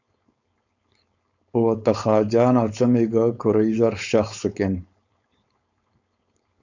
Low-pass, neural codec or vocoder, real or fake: 7.2 kHz; codec, 16 kHz, 4.8 kbps, FACodec; fake